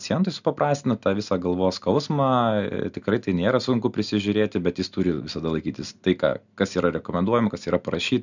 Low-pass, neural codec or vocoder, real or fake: 7.2 kHz; none; real